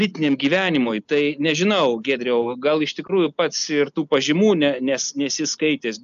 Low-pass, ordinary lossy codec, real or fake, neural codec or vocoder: 7.2 kHz; AAC, 96 kbps; real; none